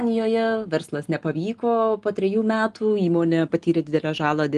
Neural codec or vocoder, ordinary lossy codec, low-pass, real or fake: none; Opus, 24 kbps; 10.8 kHz; real